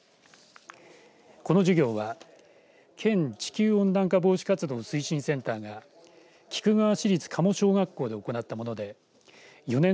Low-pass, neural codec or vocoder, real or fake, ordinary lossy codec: none; none; real; none